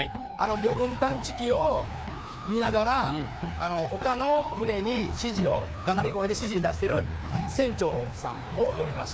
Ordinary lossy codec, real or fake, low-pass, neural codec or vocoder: none; fake; none; codec, 16 kHz, 2 kbps, FreqCodec, larger model